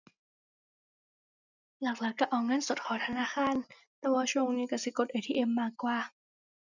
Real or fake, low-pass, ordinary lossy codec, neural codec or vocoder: real; 7.2 kHz; none; none